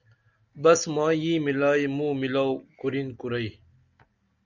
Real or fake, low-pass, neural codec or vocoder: real; 7.2 kHz; none